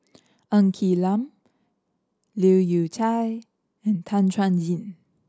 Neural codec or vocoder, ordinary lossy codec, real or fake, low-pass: none; none; real; none